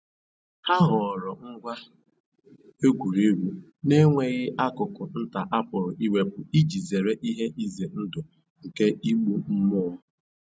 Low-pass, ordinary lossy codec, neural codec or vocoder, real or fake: none; none; none; real